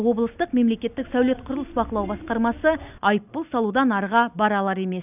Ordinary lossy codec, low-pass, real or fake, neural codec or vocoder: none; 3.6 kHz; real; none